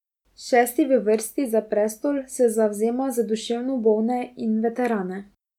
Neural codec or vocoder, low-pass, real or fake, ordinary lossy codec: none; 19.8 kHz; real; none